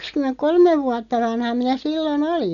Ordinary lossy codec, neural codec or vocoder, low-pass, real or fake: MP3, 96 kbps; none; 7.2 kHz; real